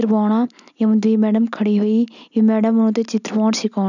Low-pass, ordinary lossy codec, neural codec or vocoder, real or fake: 7.2 kHz; none; none; real